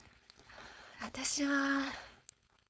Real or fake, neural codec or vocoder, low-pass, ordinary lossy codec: fake; codec, 16 kHz, 4.8 kbps, FACodec; none; none